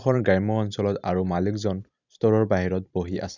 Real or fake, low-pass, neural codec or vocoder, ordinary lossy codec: fake; 7.2 kHz; vocoder, 44.1 kHz, 128 mel bands every 256 samples, BigVGAN v2; none